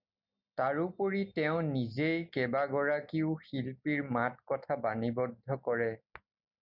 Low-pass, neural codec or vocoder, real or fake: 5.4 kHz; none; real